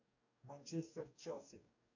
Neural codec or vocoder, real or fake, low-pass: codec, 44.1 kHz, 2.6 kbps, DAC; fake; 7.2 kHz